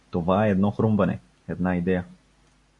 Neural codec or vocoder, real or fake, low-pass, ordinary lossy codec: none; real; 10.8 kHz; MP3, 64 kbps